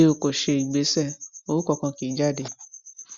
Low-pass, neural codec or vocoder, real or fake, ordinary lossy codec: 7.2 kHz; none; real; Opus, 64 kbps